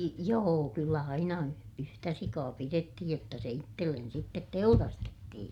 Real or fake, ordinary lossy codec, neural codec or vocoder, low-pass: fake; none; vocoder, 44.1 kHz, 128 mel bands every 256 samples, BigVGAN v2; 19.8 kHz